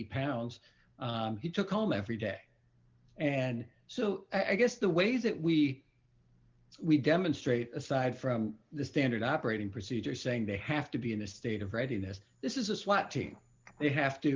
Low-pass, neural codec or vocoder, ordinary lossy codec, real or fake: 7.2 kHz; none; Opus, 32 kbps; real